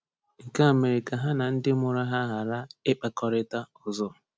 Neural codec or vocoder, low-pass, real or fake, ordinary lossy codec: none; none; real; none